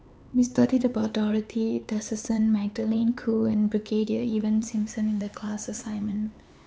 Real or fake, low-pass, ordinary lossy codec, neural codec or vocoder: fake; none; none; codec, 16 kHz, 4 kbps, X-Codec, HuBERT features, trained on LibriSpeech